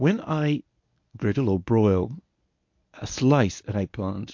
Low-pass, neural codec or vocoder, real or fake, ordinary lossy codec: 7.2 kHz; codec, 24 kHz, 0.9 kbps, WavTokenizer, medium speech release version 1; fake; MP3, 48 kbps